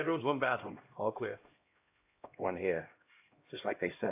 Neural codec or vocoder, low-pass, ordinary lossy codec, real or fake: codec, 16 kHz, 1 kbps, X-Codec, HuBERT features, trained on LibriSpeech; 3.6 kHz; AAC, 24 kbps; fake